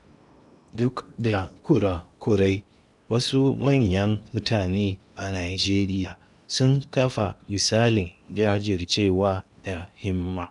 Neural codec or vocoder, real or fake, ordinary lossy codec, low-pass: codec, 16 kHz in and 24 kHz out, 0.8 kbps, FocalCodec, streaming, 65536 codes; fake; none; 10.8 kHz